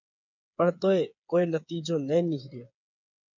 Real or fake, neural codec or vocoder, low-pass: fake; codec, 16 kHz, 8 kbps, FreqCodec, smaller model; 7.2 kHz